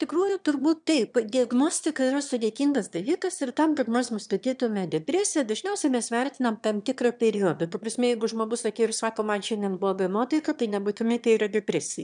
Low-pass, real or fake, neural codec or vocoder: 9.9 kHz; fake; autoencoder, 22.05 kHz, a latent of 192 numbers a frame, VITS, trained on one speaker